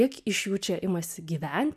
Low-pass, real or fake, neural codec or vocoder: 14.4 kHz; real; none